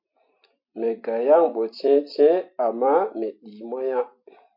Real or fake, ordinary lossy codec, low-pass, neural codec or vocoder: fake; MP3, 32 kbps; 5.4 kHz; vocoder, 44.1 kHz, 128 mel bands every 256 samples, BigVGAN v2